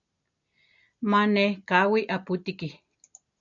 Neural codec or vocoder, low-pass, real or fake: none; 7.2 kHz; real